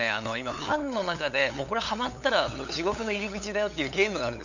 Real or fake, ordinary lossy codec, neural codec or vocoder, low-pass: fake; none; codec, 16 kHz, 8 kbps, FunCodec, trained on LibriTTS, 25 frames a second; 7.2 kHz